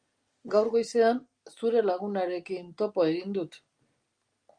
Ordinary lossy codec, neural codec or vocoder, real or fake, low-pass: Opus, 32 kbps; none; real; 9.9 kHz